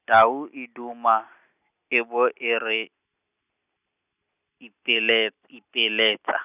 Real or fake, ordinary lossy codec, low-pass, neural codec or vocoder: real; none; 3.6 kHz; none